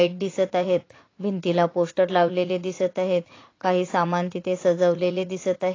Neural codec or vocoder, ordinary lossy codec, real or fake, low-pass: vocoder, 44.1 kHz, 80 mel bands, Vocos; AAC, 32 kbps; fake; 7.2 kHz